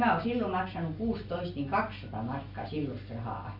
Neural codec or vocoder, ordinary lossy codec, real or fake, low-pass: none; Opus, 64 kbps; real; 5.4 kHz